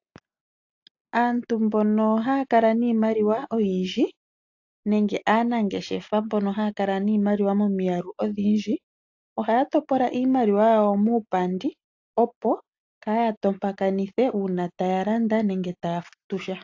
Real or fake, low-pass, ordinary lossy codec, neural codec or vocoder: real; 7.2 kHz; AAC, 48 kbps; none